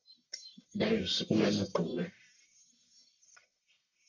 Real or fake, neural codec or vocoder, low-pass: fake; codec, 44.1 kHz, 1.7 kbps, Pupu-Codec; 7.2 kHz